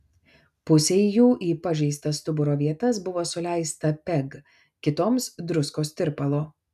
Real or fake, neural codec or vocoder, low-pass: real; none; 14.4 kHz